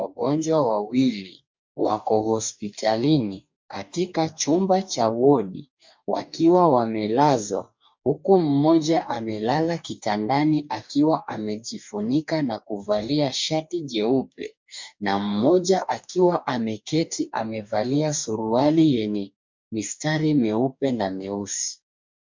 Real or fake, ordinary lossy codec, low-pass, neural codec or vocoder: fake; MP3, 64 kbps; 7.2 kHz; codec, 44.1 kHz, 2.6 kbps, DAC